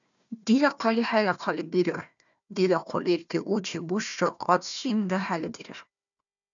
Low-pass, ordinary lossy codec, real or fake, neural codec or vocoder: 7.2 kHz; MP3, 96 kbps; fake; codec, 16 kHz, 1 kbps, FunCodec, trained on Chinese and English, 50 frames a second